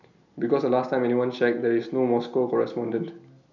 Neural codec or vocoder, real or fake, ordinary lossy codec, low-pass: none; real; none; 7.2 kHz